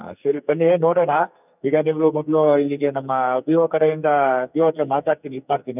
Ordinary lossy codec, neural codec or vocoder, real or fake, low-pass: none; codec, 44.1 kHz, 2.6 kbps, SNAC; fake; 3.6 kHz